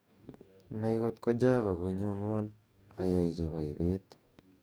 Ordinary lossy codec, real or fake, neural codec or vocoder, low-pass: none; fake; codec, 44.1 kHz, 2.6 kbps, DAC; none